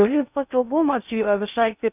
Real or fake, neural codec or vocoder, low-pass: fake; codec, 16 kHz in and 24 kHz out, 0.6 kbps, FocalCodec, streaming, 4096 codes; 3.6 kHz